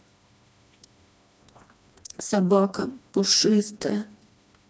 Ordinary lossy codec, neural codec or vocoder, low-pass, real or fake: none; codec, 16 kHz, 2 kbps, FreqCodec, smaller model; none; fake